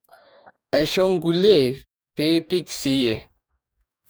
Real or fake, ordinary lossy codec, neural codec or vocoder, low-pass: fake; none; codec, 44.1 kHz, 2.6 kbps, DAC; none